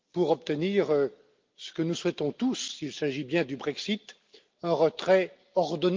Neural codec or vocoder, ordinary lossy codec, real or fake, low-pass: none; Opus, 32 kbps; real; 7.2 kHz